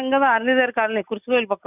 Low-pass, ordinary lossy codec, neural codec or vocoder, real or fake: 3.6 kHz; none; none; real